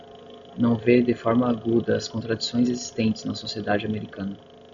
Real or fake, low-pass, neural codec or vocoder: real; 7.2 kHz; none